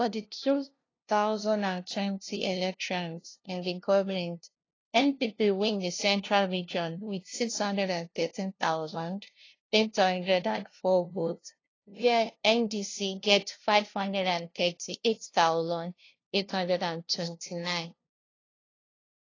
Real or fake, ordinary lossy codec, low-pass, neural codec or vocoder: fake; AAC, 32 kbps; 7.2 kHz; codec, 16 kHz, 0.5 kbps, FunCodec, trained on LibriTTS, 25 frames a second